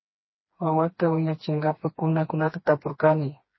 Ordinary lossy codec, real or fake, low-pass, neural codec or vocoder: MP3, 24 kbps; fake; 7.2 kHz; codec, 16 kHz, 2 kbps, FreqCodec, smaller model